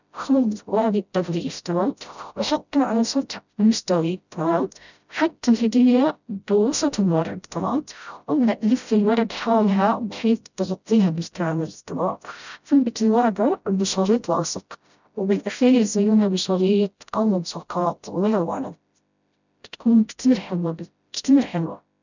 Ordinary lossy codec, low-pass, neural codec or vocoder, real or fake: none; 7.2 kHz; codec, 16 kHz, 0.5 kbps, FreqCodec, smaller model; fake